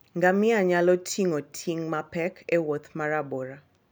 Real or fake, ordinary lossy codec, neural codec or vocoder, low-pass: real; none; none; none